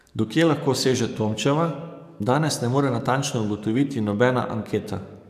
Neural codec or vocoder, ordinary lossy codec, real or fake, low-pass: codec, 44.1 kHz, 7.8 kbps, DAC; none; fake; 14.4 kHz